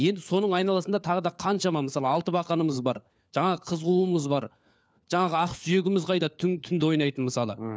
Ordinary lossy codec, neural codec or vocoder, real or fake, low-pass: none; codec, 16 kHz, 4 kbps, FunCodec, trained on LibriTTS, 50 frames a second; fake; none